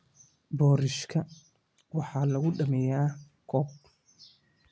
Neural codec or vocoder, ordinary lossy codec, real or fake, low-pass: none; none; real; none